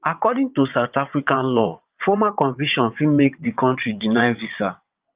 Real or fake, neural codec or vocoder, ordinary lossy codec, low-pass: fake; vocoder, 22.05 kHz, 80 mel bands, WaveNeXt; Opus, 24 kbps; 3.6 kHz